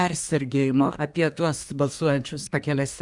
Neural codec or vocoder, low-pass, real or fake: codec, 24 kHz, 1 kbps, SNAC; 10.8 kHz; fake